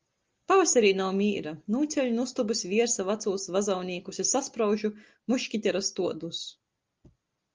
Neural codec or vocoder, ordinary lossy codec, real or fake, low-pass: none; Opus, 32 kbps; real; 7.2 kHz